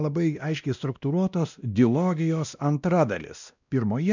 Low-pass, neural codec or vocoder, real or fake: 7.2 kHz; codec, 16 kHz, 1 kbps, X-Codec, WavLM features, trained on Multilingual LibriSpeech; fake